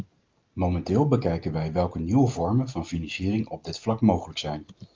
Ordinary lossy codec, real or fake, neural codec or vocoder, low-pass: Opus, 24 kbps; real; none; 7.2 kHz